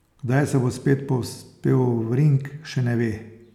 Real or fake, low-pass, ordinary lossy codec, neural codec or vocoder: real; 19.8 kHz; none; none